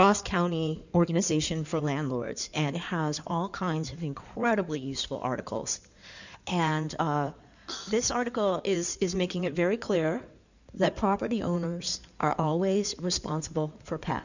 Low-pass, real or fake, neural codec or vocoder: 7.2 kHz; fake; codec, 16 kHz in and 24 kHz out, 2.2 kbps, FireRedTTS-2 codec